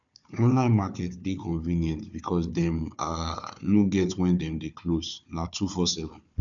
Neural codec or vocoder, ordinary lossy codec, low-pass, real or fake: codec, 16 kHz, 4 kbps, FunCodec, trained on Chinese and English, 50 frames a second; none; 7.2 kHz; fake